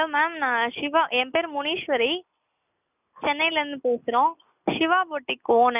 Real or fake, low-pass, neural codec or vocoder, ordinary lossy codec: real; 3.6 kHz; none; none